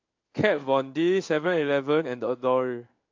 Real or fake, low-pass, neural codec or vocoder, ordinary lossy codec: fake; 7.2 kHz; codec, 16 kHz in and 24 kHz out, 1 kbps, XY-Tokenizer; MP3, 48 kbps